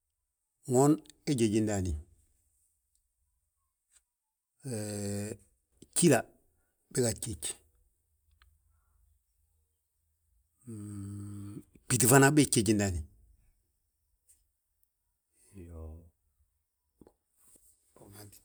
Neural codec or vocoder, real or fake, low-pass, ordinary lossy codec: none; real; none; none